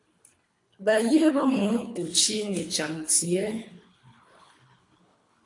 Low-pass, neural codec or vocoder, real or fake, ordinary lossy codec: 10.8 kHz; codec, 24 kHz, 3 kbps, HILCodec; fake; AAC, 48 kbps